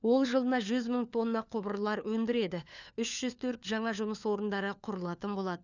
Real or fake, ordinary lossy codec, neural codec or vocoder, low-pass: fake; none; codec, 16 kHz, 4 kbps, FunCodec, trained on LibriTTS, 50 frames a second; 7.2 kHz